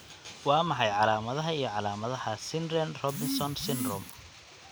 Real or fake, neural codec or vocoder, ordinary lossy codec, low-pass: real; none; none; none